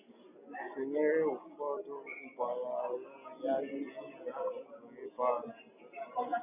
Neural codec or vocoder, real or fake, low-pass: none; real; 3.6 kHz